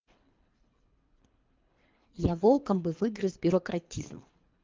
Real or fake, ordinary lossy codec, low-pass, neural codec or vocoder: fake; Opus, 24 kbps; 7.2 kHz; codec, 24 kHz, 3 kbps, HILCodec